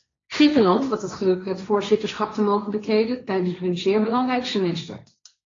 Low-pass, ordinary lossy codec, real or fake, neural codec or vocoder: 7.2 kHz; AAC, 32 kbps; fake; codec, 16 kHz, 1.1 kbps, Voila-Tokenizer